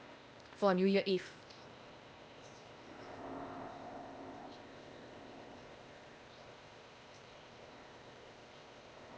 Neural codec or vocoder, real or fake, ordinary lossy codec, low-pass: codec, 16 kHz, 0.8 kbps, ZipCodec; fake; none; none